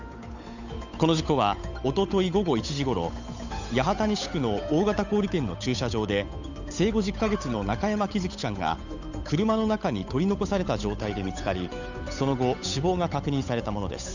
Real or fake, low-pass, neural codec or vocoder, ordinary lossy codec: fake; 7.2 kHz; codec, 16 kHz, 8 kbps, FunCodec, trained on Chinese and English, 25 frames a second; none